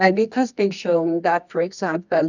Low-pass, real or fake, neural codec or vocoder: 7.2 kHz; fake; codec, 24 kHz, 0.9 kbps, WavTokenizer, medium music audio release